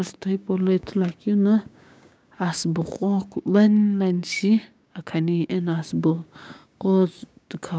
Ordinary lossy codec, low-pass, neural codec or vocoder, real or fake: none; none; codec, 16 kHz, 6 kbps, DAC; fake